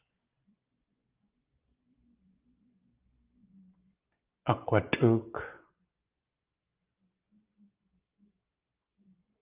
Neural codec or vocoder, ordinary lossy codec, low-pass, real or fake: vocoder, 24 kHz, 100 mel bands, Vocos; Opus, 24 kbps; 3.6 kHz; fake